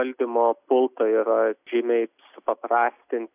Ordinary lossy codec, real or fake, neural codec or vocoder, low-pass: AAC, 32 kbps; real; none; 3.6 kHz